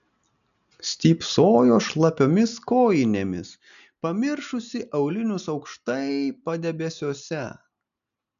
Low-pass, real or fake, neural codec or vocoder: 7.2 kHz; real; none